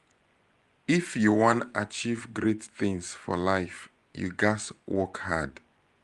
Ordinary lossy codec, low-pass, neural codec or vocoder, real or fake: Opus, 32 kbps; 10.8 kHz; vocoder, 24 kHz, 100 mel bands, Vocos; fake